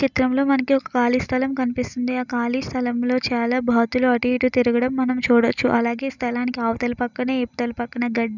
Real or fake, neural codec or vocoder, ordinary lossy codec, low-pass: real; none; none; 7.2 kHz